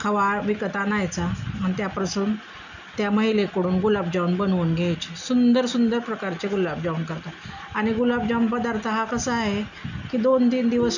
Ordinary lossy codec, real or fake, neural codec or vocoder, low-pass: none; real; none; 7.2 kHz